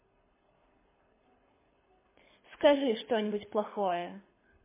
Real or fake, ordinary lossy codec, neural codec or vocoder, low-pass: fake; MP3, 16 kbps; codec, 24 kHz, 6 kbps, HILCodec; 3.6 kHz